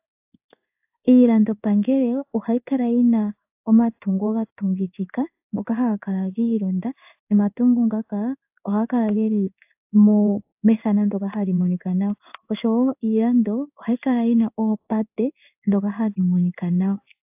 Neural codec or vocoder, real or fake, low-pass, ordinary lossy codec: codec, 16 kHz in and 24 kHz out, 1 kbps, XY-Tokenizer; fake; 3.6 kHz; AAC, 32 kbps